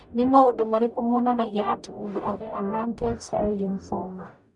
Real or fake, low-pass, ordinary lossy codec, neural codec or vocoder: fake; 10.8 kHz; none; codec, 44.1 kHz, 0.9 kbps, DAC